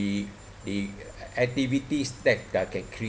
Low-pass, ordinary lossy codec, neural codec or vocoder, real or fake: none; none; none; real